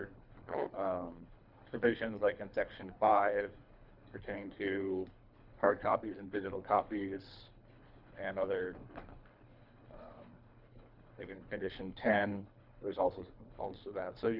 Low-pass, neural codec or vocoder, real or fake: 5.4 kHz; codec, 24 kHz, 3 kbps, HILCodec; fake